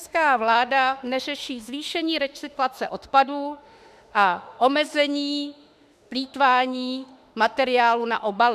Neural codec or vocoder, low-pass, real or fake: autoencoder, 48 kHz, 32 numbers a frame, DAC-VAE, trained on Japanese speech; 14.4 kHz; fake